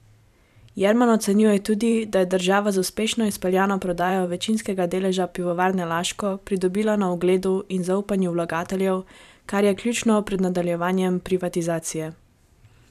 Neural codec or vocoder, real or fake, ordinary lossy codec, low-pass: vocoder, 44.1 kHz, 128 mel bands every 512 samples, BigVGAN v2; fake; none; 14.4 kHz